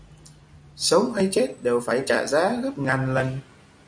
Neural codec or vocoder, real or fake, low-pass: none; real; 9.9 kHz